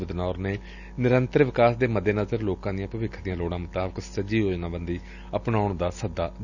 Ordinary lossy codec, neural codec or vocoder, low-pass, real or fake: none; none; 7.2 kHz; real